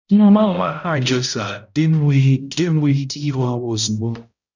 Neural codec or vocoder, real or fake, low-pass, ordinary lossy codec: codec, 16 kHz, 0.5 kbps, X-Codec, HuBERT features, trained on balanced general audio; fake; 7.2 kHz; none